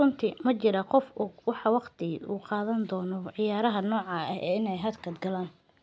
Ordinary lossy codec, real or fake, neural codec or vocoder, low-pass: none; real; none; none